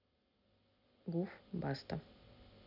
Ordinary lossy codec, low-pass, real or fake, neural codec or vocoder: MP3, 48 kbps; 5.4 kHz; real; none